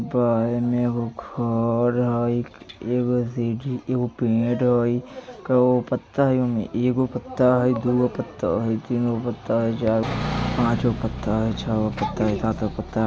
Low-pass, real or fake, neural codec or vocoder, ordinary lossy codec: none; real; none; none